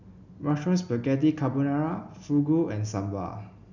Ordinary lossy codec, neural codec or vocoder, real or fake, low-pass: none; none; real; 7.2 kHz